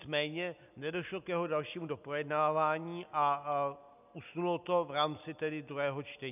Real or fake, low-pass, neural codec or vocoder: real; 3.6 kHz; none